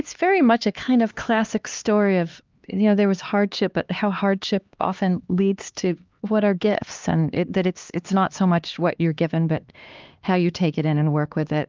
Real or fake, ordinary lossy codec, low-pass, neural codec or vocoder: fake; Opus, 24 kbps; 7.2 kHz; codec, 16 kHz, 2 kbps, X-Codec, HuBERT features, trained on LibriSpeech